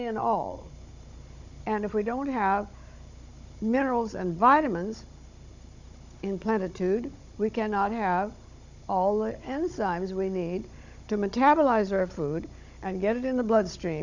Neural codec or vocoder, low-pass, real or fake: codec, 16 kHz, 16 kbps, FunCodec, trained on Chinese and English, 50 frames a second; 7.2 kHz; fake